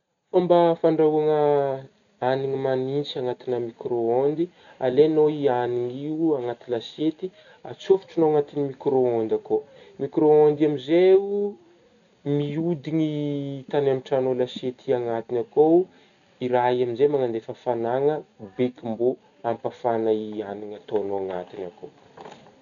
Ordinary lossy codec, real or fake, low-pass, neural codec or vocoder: none; real; 7.2 kHz; none